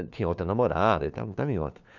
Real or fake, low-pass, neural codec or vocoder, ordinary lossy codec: fake; 7.2 kHz; codec, 16 kHz, 4 kbps, FunCodec, trained on LibriTTS, 50 frames a second; none